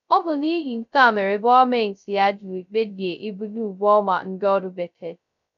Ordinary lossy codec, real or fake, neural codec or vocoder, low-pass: none; fake; codec, 16 kHz, 0.2 kbps, FocalCodec; 7.2 kHz